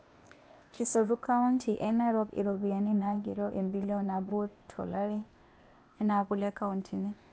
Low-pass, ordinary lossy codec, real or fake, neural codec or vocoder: none; none; fake; codec, 16 kHz, 0.8 kbps, ZipCodec